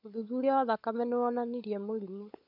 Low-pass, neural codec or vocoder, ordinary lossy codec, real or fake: 5.4 kHz; codec, 16 kHz, 16 kbps, FunCodec, trained on LibriTTS, 50 frames a second; none; fake